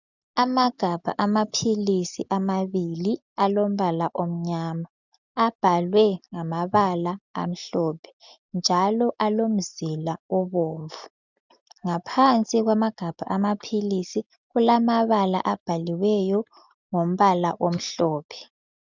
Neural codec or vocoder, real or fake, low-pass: none; real; 7.2 kHz